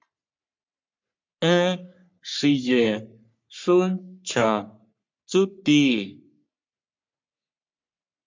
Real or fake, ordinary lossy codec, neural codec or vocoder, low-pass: fake; MP3, 64 kbps; codec, 44.1 kHz, 3.4 kbps, Pupu-Codec; 7.2 kHz